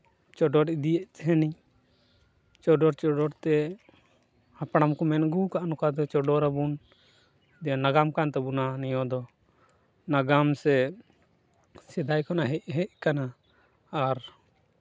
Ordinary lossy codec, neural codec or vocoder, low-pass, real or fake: none; none; none; real